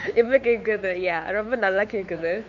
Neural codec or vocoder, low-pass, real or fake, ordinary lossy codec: codec, 16 kHz, 4 kbps, X-Codec, WavLM features, trained on Multilingual LibriSpeech; 7.2 kHz; fake; none